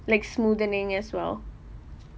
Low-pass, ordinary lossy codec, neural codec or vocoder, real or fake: none; none; none; real